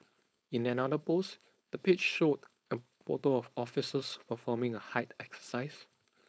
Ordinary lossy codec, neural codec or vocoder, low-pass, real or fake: none; codec, 16 kHz, 4.8 kbps, FACodec; none; fake